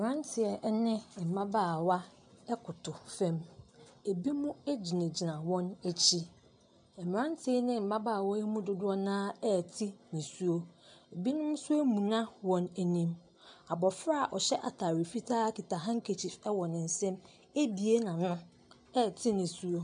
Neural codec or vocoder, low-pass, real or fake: none; 9.9 kHz; real